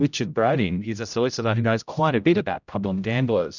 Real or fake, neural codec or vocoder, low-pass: fake; codec, 16 kHz, 0.5 kbps, X-Codec, HuBERT features, trained on general audio; 7.2 kHz